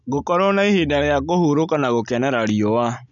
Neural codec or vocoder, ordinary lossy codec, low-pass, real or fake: none; none; 7.2 kHz; real